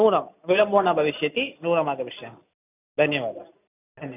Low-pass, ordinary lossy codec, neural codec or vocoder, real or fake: 3.6 kHz; none; none; real